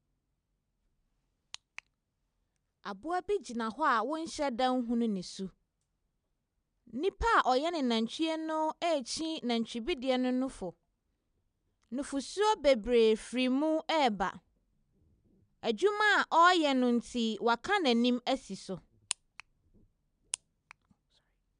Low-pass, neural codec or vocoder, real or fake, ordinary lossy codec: 9.9 kHz; none; real; none